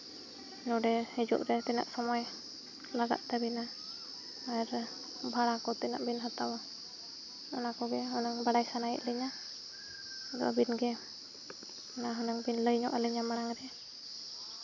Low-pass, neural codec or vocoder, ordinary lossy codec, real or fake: 7.2 kHz; none; none; real